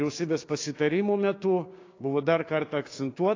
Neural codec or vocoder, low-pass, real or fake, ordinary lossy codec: codec, 16 kHz, 6 kbps, DAC; 7.2 kHz; fake; AAC, 32 kbps